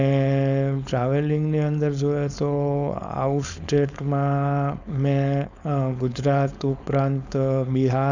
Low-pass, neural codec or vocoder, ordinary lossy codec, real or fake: 7.2 kHz; codec, 16 kHz, 4.8 kbps, FACodec; none; fake